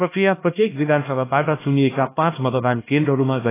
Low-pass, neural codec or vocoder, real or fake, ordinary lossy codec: 3.6 kHz; codec, 16 kHz, 1 kbps, X-Codec, HuBERT features, trained on LibriSpeech; fake; AAC, 16 kbps